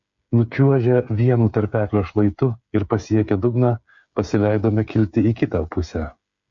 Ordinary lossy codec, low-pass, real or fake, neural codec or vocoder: MP3, 48 kbps; 7.2 kHz; fake; codec, 16 kHz, 8 kbps, FreqCodec, smaller model